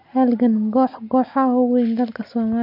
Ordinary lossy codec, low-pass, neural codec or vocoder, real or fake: none; 5.4 kHz; none; real